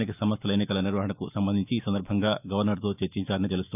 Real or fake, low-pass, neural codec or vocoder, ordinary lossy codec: fake; 3.6 kHz; autoencoder, 48 kHz, 128 numbers a frame, DAC-VAE, trained on Japanese speech; none